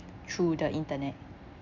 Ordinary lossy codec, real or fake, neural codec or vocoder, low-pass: none; real; none; 7.2 kHz